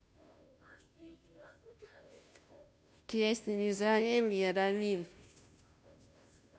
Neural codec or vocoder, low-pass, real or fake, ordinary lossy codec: codec, 16 kHz, 0.5 kbps, FunCodec, trained on Chinese and English, 25 frames a second; none; fake; none